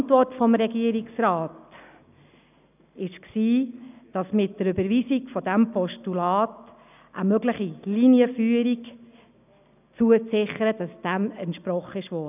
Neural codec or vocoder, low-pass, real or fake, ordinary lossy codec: none; 3.6 kHz; real; none